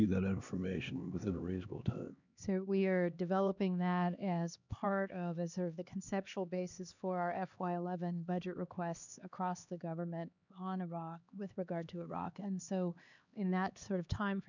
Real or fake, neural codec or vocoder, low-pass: fake; codec, 16 kHz, 2 kbps, X-Codec, HuBERT features, trained on LibriSpeech; 7.2 kHz